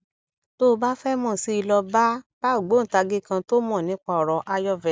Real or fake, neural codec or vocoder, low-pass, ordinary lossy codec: real; none; none; none